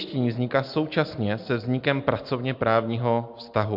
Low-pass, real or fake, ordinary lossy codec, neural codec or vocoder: 5.4 kHz; real; MP3, 48 kbps; none